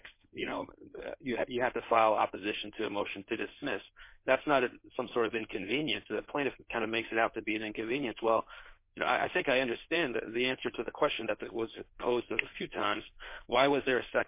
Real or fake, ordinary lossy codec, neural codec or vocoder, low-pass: fake; MP3, 24 kbps; codec, 16 kHz in and 24 kHz out, 2.2 kbps, FireRedTTS-2 codec; 3.6 kHz